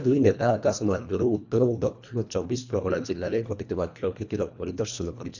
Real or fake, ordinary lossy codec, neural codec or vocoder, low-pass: fake; none; codec, 24 kHz, 1.5 kbps, HILCodec; 7.2 kHz